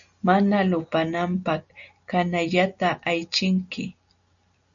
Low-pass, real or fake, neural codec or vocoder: 7.2 kHz; real; none